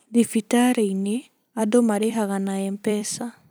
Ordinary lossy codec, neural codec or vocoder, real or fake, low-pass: none; vocoder, 44.1 kHz, 128 mel bands every 256 samples, BigVGAN v2; fake; none